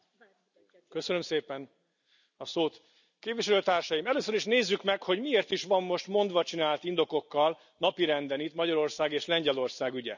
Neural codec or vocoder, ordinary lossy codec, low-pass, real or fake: none; none; 7.2 kHz; real